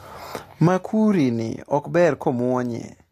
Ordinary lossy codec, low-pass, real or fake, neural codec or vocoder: AAC, 48 kbps; 14.4 kHz; real; none